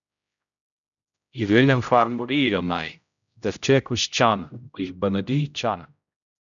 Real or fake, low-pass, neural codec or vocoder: fake; 7.2 kHz; codec, 16 kHz, 0.5 kbps, X-Codec, HuBERT features, trained on general audio